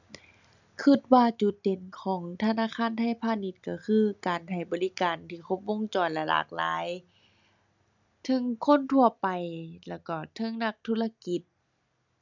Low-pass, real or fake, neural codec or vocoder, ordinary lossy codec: 7.2 kHz; real; none; none